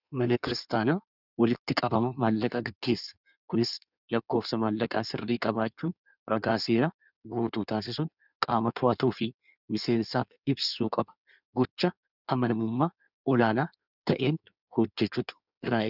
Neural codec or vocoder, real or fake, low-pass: codec, 16 kHz in and 24 kHz out, 1.1 kbps, FireRedTTS-2 codec; fake; 5.4 kHz